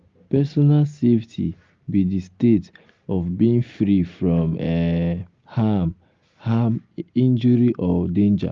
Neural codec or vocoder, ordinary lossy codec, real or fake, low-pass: none; Opus, 32 kbps; real; 7.2 kHz